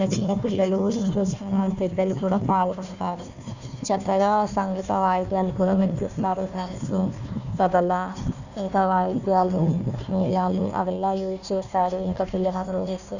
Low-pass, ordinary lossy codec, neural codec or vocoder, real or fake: 7.2 kHz; none; codec, 16 kHz, 1 kbps, FunCodec, trained on Chinese and English, 50 frames a second; fake